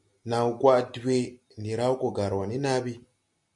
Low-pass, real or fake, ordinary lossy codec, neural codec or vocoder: 10.8 kHz; real; MP3, 64 kbps; none